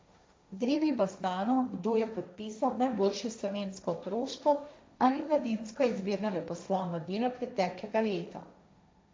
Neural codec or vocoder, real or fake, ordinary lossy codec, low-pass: codec, 16 kHz, 1.1 kbps, Voila-Tokenizer; fake; none; none